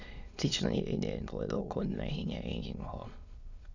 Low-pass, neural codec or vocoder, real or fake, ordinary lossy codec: 7.2 kHz; autoencoder, 22.05 kHz, a latent of 192 numbers a frame, VITS, trained on many speakers; fake; Opus, 64 kbps